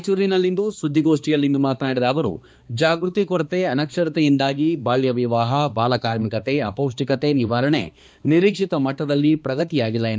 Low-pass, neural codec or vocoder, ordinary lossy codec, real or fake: none; codec, 16 kHz, 2 kbps, X-Codec, HuBERT features, trained on balanced general audio; none; fake